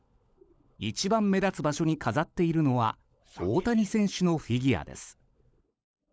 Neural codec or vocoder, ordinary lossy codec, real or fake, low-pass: codec, 16 kHz, 16 kbps, FunCodec, trained on LibriTTS, 50 frames a second; none; fake; none